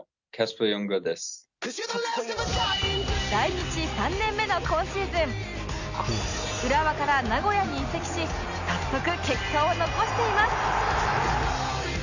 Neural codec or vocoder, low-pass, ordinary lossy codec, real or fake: none; 7.2 kHz; none; real